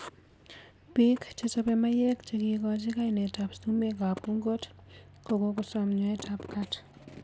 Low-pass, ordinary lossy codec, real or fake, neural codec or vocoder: none; none; real; none